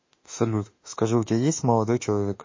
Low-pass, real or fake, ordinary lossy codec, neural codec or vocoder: 7.2 kHz; fake; MP3, 32 kbps; autoencoder, 48 kHz, 32 numbers a frame, DAC-VAE, trained on Japanese speech